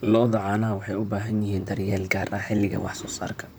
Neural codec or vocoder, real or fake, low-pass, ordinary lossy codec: vocoder, 44.1 kHz, 128 mel bands, Pupu-Vocoder; fake; none; none